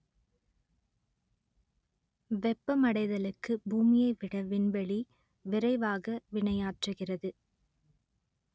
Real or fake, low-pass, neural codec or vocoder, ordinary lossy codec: real; none; none; none